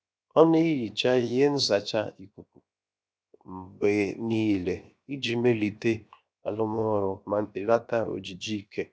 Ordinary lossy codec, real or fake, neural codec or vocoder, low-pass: none; fake; codec, 16 kHz, 0.7 kbps, FocalCodec; none